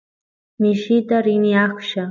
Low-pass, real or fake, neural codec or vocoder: 7.2 kHz; real; none